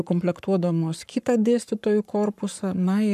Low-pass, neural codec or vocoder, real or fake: 14.4 kHz; codec, 44.1 kHz, 7.8 kbps, Pupu-Codec; fake